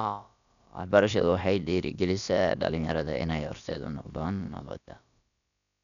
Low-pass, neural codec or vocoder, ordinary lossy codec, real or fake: 7.2 kHz; codec, 16 kHz, about 1 kbps, DyCAST, with the encoder's durations; none; fake